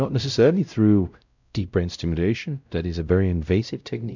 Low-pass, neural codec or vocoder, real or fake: 7.2 kHz; codec, 16 kHz, 0.5 kbps, X-Codec, WavLM features, trained on Multilingual LibriSpeech; fake